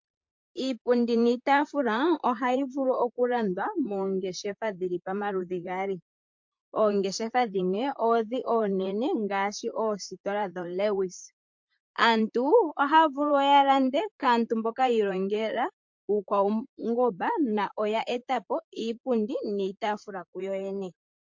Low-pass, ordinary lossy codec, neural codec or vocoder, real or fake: 7.2 kHz; MP3, 48 kbps; vocoder, 44.1 kHz, 128 mel bands, Pupu-Vocoder; fake